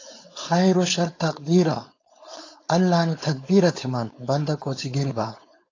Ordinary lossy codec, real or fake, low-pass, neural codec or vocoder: AAC, 32 kbps; fake; 7.2 kHz; codec, 16 kHz, 4.8 kbps, FACodec